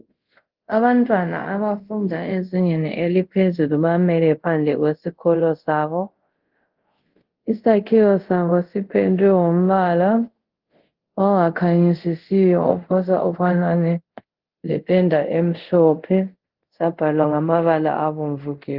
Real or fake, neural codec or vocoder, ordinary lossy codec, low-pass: fake; codec, 24 kHz, 0.5 kbps, DualCodec; Opus, 16 kbps; 5.4 kHz